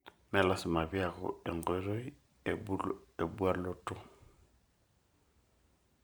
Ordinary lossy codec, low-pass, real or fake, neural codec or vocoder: none; none; fake; vocoder, 44.1 kHz, 128 mel bands every 512 samples, BigVGAN v2